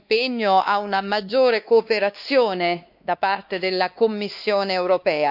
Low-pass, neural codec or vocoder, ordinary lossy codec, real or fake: 5.4 kHz; codec, 16 kHz, 2 kbps, X-Codec, WavLM features, trained on Multilingual LibriSpeech; none; fake